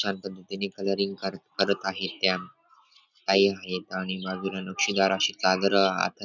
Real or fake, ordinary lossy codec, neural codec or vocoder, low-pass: real; none; none; 7.2 kHz